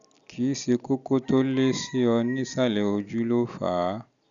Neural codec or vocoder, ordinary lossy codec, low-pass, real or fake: none; none; 7.2 kHz; real